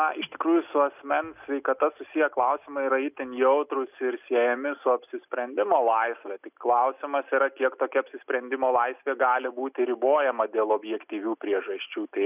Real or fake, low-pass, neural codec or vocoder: real; 3.6 kHz; none